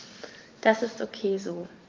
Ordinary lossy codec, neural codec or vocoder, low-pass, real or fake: Opus, 32 kbps; vocoder, 44.1 kHz, 128 mel bands every 512 samples, BigVGAN v2; 7.2 kHz; fake